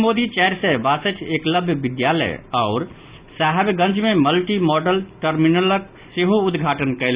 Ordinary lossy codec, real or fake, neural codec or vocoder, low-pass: Opus, 64 kbps; real; none; 3.6 kHz